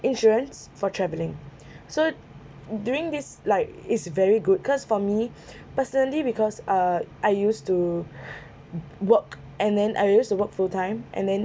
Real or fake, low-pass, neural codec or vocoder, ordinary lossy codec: real; none; none; none